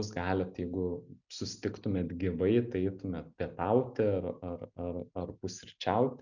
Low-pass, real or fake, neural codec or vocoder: 7.2 kHz; real; none